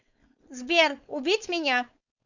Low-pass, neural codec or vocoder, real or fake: 7.2 kHz; codec, 16 kHz, 4.8 kbps, FACodec; fake